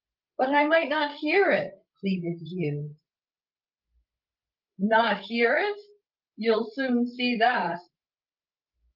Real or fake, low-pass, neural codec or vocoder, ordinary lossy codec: fake; 5.4 kHz; codec, 16 kHz, 16 kbps, FreqCodec, larger model; Opus, 24 kbps